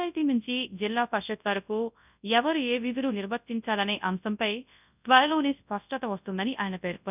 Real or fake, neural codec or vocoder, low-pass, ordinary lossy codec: fake; codec, 24 kHz, 0.9 kbps, WavTokenizer, large speech release; 3.6 kHz; none